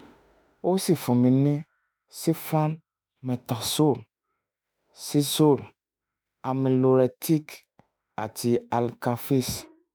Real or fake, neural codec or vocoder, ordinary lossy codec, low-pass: fake; autoencoder, 48 kHz, 32 numbers a frame, DAC-VAE, trained on Japanese speech; none; none